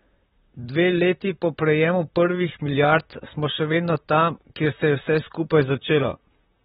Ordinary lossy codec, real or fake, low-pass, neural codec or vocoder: AAC, 16 kbps; fake; 19.8 kHz; vocoder, 44.1 kHz, 128 mel bands, Pupu-Vocoder